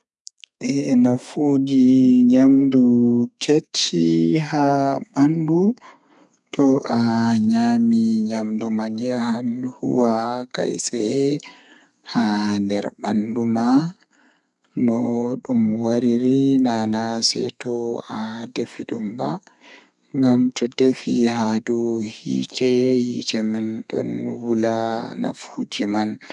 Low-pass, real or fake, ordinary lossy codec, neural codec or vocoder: 10.8 kHz; fake; none; codec, 32 kHz, 1.9 kbps, SNAC